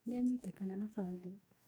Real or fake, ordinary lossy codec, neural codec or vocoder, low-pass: fake; none; codec, 44.1 kHz, 2.6 kbps, DAC; none